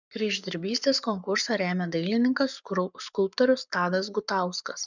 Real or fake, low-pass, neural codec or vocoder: fake; 7.2 kHz; codec, 44.1 kHz, 7.8 kbps, Pupu-Codec